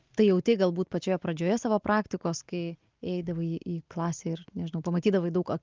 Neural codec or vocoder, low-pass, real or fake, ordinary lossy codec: none; 7.2 kHz; real; Opus, 24 kbps